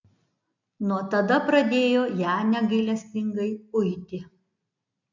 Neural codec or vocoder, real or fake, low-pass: none; real; 7.2 kHz